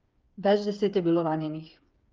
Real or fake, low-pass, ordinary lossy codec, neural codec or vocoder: fake; 7.2 kHz; Opus, 24 kbps; codec, 16 kHz, 8 kbps, FreqCodec, smaller model